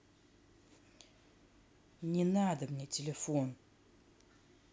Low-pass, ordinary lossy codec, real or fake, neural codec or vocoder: none; none; real; none